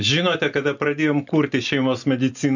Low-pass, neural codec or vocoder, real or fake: 7.2 kHz; none; real